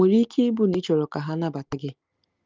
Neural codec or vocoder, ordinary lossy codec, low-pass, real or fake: none; Opus, 32 kbps; 7.2 kHz; real